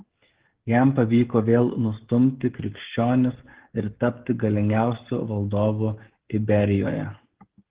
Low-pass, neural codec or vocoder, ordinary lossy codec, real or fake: 3.6 kHz; codec, 16 kHz, 16 kbps, FreqCodec, smaller model; Opus, 16 kbps; fake